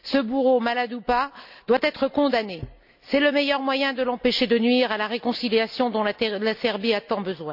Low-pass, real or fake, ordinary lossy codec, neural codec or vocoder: 5.4 kHz; real; none; none